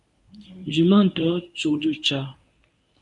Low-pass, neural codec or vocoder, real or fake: 10.8 kHz; codec, 24 kHz, 0.9 kbps, WavTokenizer, medium speech release version 1; fake